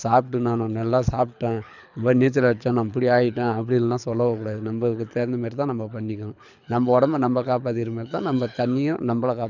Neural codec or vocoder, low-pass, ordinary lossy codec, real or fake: codec, 24 kHz, 6 kbps, HILCodec; 7.2 kHz; none; fake